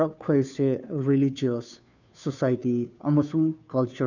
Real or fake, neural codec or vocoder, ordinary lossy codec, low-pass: fake; codec, 16 kHz, 2 kbps, FunCodec, trained on Chinese and English, 25 frames a second; none; 7.2 kHz